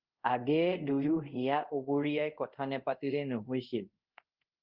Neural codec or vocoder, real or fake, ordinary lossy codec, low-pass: codec, 24 kHz, 0.5 kbps, DualCodec; fake; Opus, 32 kbps; 5.4 kHz